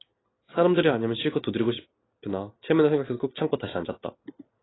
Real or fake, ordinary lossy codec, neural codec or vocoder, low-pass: real; AAC, 16 kbps; none; 7.2 kHz